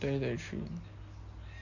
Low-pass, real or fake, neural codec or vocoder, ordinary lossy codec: 7.2 kHz; real; none; none